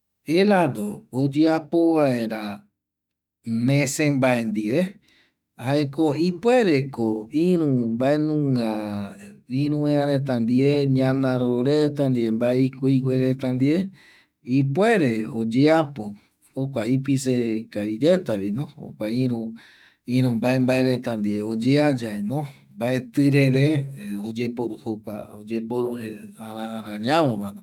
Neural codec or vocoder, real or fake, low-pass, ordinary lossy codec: autoencoder, 48 kHz, 32 numbers a frame, DAC-VAE, trained on Japanese speech; fake; 19.8 kHz; none